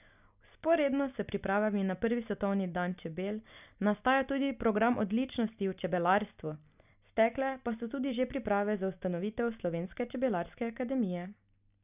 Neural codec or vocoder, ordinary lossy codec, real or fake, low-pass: none; none; real; 3.6 kHz